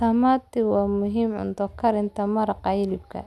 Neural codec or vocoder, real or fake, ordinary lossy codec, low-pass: none; real; none; none